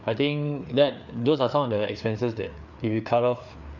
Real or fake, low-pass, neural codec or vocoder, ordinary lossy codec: fake; 7.2 kHz; codec, 16 kHz, 4 kbps, FreqCodec, larger model; none